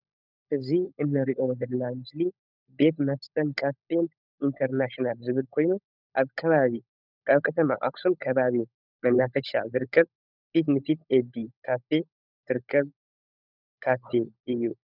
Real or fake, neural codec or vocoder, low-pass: fake; codec, 16 kHz, 16 kbps, FunCodec, trained on LibriTTS, 50 frames a second; 5.4 kHz